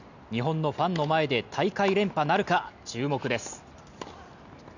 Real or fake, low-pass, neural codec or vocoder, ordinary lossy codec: real; 7.2 kHz; none; none